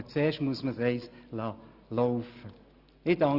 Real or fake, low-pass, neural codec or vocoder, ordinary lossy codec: real; 5.4 kHz; none; Opus, 64 kbps